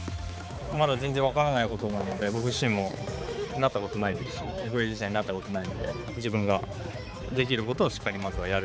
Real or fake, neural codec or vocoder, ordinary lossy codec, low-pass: fake; codec, 16 kHz, 4 kbps, X-Codec, HuBERT features, trained on balanced general audio; none; none